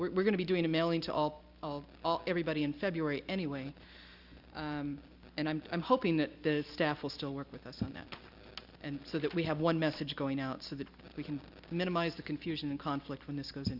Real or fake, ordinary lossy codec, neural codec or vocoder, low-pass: real; Opus, 64 kbps; none; 5.4 kHz